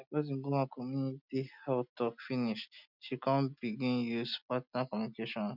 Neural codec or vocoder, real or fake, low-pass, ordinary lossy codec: none; real; 5.4 kHz; none